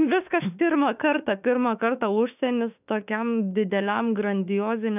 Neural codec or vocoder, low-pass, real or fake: autoencoder, 48 kHz, 32 numbers a frame, DAC-VAE, trained on Japanese speech; 3.6 kHz; fake